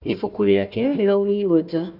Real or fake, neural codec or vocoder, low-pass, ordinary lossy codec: fake; codec, 16 kHz, 1 kbps, FunCodec, trained on Chinese and English, 50 frames a second; 5.4 kHz; none